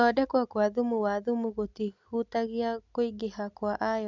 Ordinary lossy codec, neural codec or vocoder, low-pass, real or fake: none; none; 7.2 kHz; real